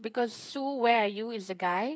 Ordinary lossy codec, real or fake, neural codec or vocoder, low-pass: none; fake; codec, 16 kHz, 8 kbps, FreqCodec, smaller model; none